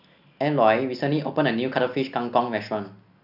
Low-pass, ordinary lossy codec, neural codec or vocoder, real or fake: 5.4 kHz; none; none; real